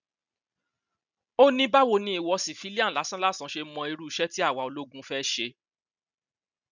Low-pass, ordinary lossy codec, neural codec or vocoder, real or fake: 7.2 kHz; none; none; real